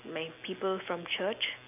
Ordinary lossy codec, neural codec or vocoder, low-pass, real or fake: none; none; 3.6 kHz; real